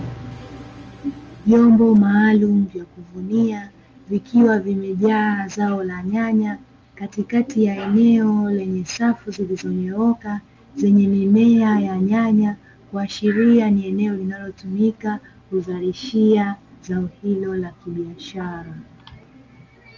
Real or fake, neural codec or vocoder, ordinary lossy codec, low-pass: real; none; Opus, 24 kbps; 7.2 kHz